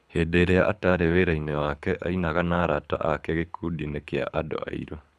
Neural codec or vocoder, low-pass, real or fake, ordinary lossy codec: codec, 24 kHz, 6 kbps, HILCodec; none; fake; none